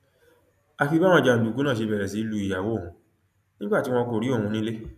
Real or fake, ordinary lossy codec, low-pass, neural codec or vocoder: real; none; 14.4 kHz; none